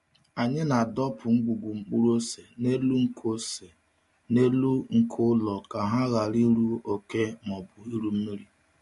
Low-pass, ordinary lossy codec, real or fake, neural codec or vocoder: 14.4 kHz; MP3, 48 kbps; real; none